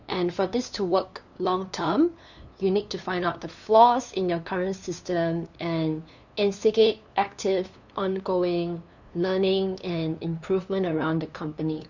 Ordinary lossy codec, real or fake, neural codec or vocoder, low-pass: none; fake; codec, 16 kHz, 2 kbps, FunCodec, trained on LibriTTS, 25 frames a second; 7.2 kHz